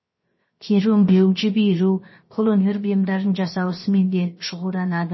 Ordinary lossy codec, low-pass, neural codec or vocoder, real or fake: MP3, 24 kbps; 7.2 kHz; codec, 16 kHz, 0.7 kbps, FocalCodec; fake